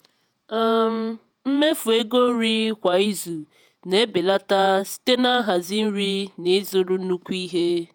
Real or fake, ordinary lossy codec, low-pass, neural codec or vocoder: fake; none; none; vocoder, 48 kHz, 128 mel bands, Vocos